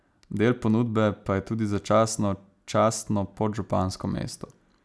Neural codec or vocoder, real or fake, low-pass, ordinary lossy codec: none; real; none; none